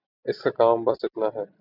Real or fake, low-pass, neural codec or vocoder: real; 5.4 kHz; none